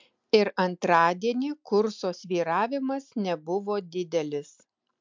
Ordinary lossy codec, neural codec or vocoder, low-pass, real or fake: MP3, 64 kbps; none; 7.2 kHz; real